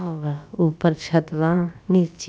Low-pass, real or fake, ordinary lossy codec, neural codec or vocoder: none; fake; none; codec, 16 kHz, about 1 kbps, DyCAST, with the encoder's durations